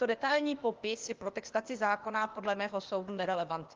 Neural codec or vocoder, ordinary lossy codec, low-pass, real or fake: codec, 16 kHz, 0.8 kbps, ZipCodec; Opus, 32 kbps; 7.2 kHz; fake